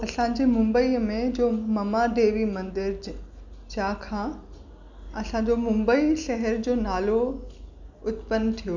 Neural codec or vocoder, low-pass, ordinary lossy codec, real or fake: none; 7.2 kHz; none; real